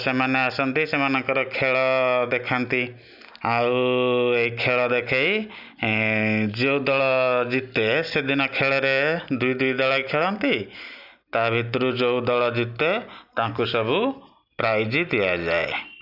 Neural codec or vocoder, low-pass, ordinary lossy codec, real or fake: none; 5.4 kHz; none; real